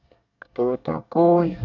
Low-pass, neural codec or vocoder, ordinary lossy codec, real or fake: 7.2 kHz; codec, 24 kHz, 1 kbps, SNAC; none; fake